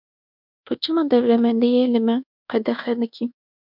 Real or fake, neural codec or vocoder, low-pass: fake; codec, 24 kHz, 1.2 kbps, DualCodec; 5.4 kHz